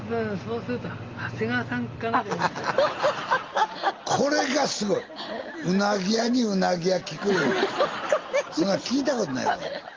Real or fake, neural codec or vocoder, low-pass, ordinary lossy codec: real; none; 7.2 kHz; Opus, 32 kbps